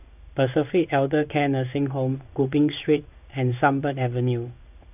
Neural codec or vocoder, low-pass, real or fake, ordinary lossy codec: codec, 16 kHz in and 24 kHz out, 1 kbps, XY-Tokenizer; 3.6 kHz; fake; none